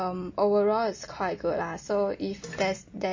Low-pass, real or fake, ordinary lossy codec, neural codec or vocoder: 7.2 kHz; real; MP3, 32 kbps; none